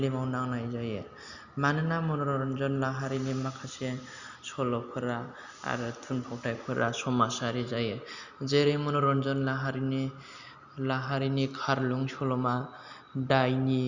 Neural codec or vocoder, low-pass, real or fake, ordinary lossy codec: none; 7.2 kHz; real; Opus, 64 kbps